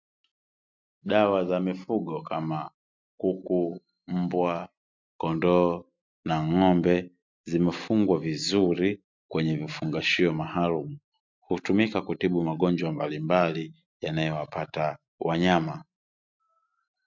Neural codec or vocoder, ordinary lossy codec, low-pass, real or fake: none; MP3, 64 kbps; 7.2 kHz; real